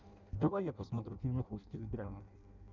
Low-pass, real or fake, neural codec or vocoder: 7.2 kHz; fake; codec, 16 kHz in and 24 kHz out, 0.6 kbps, FireRedTTS-2 codec